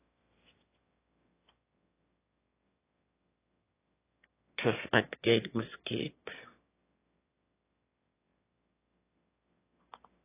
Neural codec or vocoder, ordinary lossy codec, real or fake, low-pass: autoencoder, 22.05 kHz, a latent of 192 numbers a frame, VITS, trained on one speaker; AAC, 16 kbps; fake; 3.6 kHz